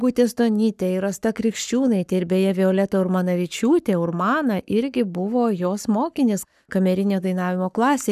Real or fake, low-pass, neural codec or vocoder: fake; 14.4 kHz; codec, 44.1 kHz, 7.8 kbps, Pupu-Codec